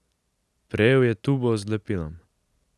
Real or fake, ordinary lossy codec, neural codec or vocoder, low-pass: real; none; none; none